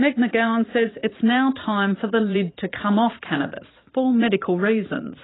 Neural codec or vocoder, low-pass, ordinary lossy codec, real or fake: vocoder, 44.1 kHz, 80 mel bands, Vocos; 7.2 kHz; AAC, 16 kbps; fake